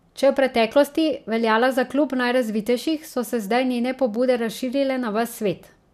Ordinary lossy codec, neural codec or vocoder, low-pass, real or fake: none; none; 14.4 kHz; real